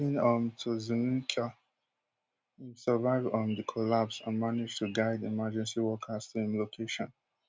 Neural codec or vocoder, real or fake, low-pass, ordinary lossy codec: none; real; none; none